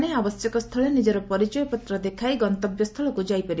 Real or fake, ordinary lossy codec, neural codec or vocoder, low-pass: real; none; none; none